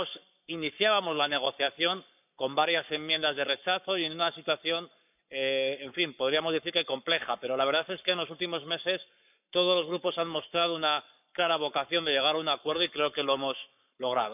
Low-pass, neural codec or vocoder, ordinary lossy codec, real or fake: 3.6 kHz; codec, 44.1 kHz, 7.8 kbps, Pupu-Codec; none; fake